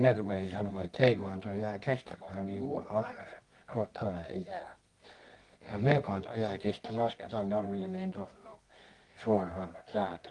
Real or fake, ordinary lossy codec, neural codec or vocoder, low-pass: fake; Opus, 24 kbps; codec, 24 kHz, 0.9 kbps, WavTokenizer, medium music audio release; 10.8 kHz